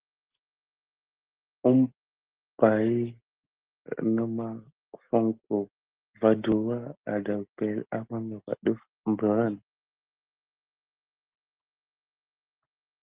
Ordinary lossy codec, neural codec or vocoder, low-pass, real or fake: Opus, 16 kbps; none; 3.6 kHz; real